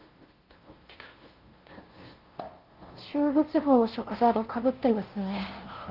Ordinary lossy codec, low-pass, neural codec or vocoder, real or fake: Opus, 16 kbps; 5.4 kHz; codec, 16 kHz, 0.5 kbps, FunCodec, trained on LibriTTS, 25 frames a second; fake